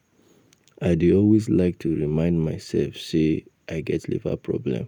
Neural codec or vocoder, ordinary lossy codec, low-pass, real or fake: none; none; 19.8 kHz; real